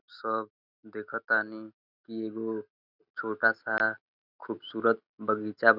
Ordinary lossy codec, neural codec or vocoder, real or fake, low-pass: none; none; real; 5.4 kHz